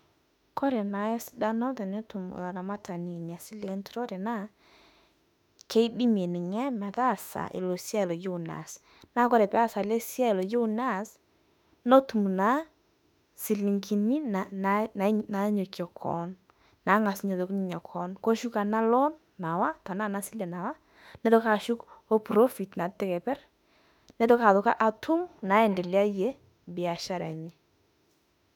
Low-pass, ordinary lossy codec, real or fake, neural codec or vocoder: 19.8 kHz; none; fake; autoencoder, 48 kHz, 32 numbers a frame, DAC-VAE, trained on Japanese speech